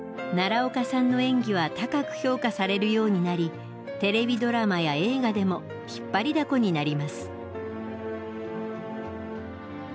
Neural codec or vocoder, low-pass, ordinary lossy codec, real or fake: none; none; none; real